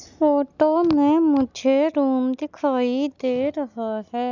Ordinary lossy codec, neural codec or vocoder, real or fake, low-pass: none; none; real; 7.2 kHz